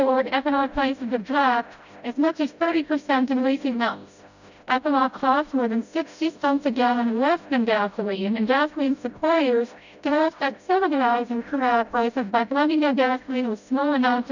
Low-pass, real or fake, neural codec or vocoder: 7.2 kHz; fake; codec, 16 kHz, 0.5 kbps, FreqCodec, smaller model